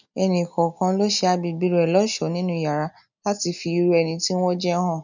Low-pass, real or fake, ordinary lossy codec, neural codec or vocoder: 7.2 kHz; real; none; none